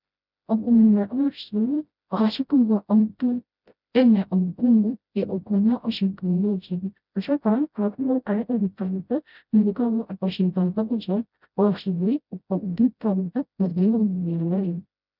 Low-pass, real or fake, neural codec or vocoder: 5.4 kHz; fake; codec, 16 kHz, 0.5 kbps, FreqCodec, smaller model